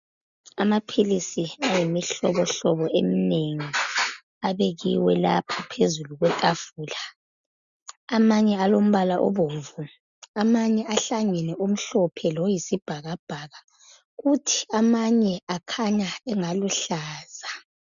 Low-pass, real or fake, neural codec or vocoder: 7.2 kHz; real; none